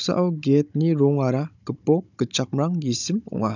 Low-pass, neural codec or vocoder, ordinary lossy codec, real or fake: 7.2 kHz; codec, 16 kHz, 16 kbps, FunCodec, trained on Chinese and English, 50 frames a second; none; fake